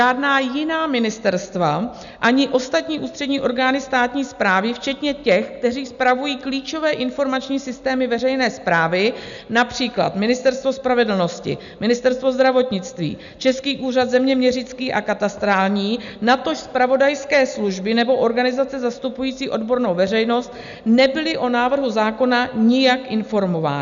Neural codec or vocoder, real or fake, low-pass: none; real; 7.2 kHz